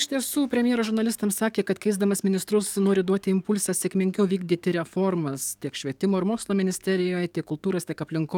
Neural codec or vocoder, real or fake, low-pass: codec, 44.1 kHz, 7.8 kbps, DAC; fake; 19.8 kHz